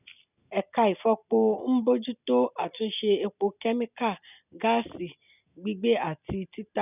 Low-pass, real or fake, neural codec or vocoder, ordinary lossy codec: 3.6 kHz; real; none; none